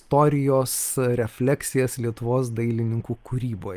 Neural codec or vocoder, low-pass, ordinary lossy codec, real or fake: none; 14.4 kHz; Opus, 32 kbps; real